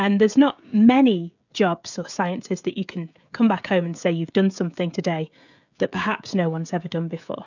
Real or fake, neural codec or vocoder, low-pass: fake; codec, 16 kHz, 16 kbps, FreqCodec, smaller model; 7.2 kHz